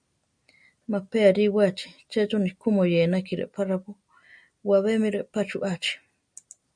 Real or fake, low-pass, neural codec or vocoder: real; 9.9 kHz; none